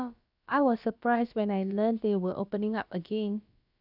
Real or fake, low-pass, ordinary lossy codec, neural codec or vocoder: fake; 5.4 kHz; none; codec, 16 kHz, about 1 kbps, DyCAST, with the encoder's durations